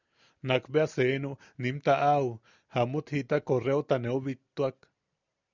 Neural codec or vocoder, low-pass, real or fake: none; 7.2 kHz; real